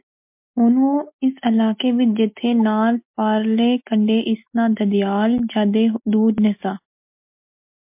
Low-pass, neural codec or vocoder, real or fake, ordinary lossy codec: 3.6 kHz; none; real; MP3, 32 kbps